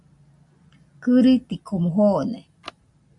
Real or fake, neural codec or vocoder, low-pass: real; none; 10.8 kHz